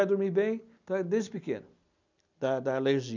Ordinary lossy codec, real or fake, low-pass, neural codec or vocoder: none; real; 7.2 kHz; none